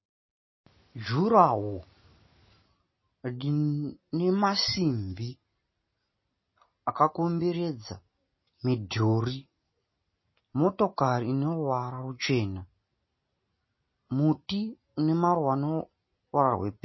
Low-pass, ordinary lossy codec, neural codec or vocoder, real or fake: 7.2 kHz; MP3, 24 kbps; none; real